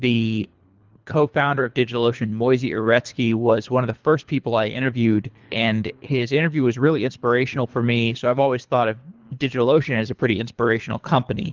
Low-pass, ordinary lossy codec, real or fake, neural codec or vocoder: 7.2 kHz; Opus, 24 kbps; fake; codec, 24 kHz, 3 kbps, HILCodec